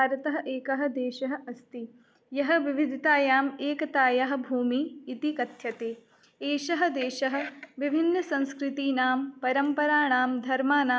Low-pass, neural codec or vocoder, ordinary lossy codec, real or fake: none; none; none; real